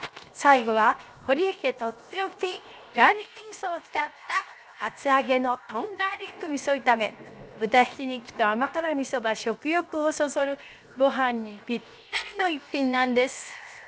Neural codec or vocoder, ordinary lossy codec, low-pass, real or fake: codec, 16 kHz, 0.7 kbps, FocalCodec; none; none; fake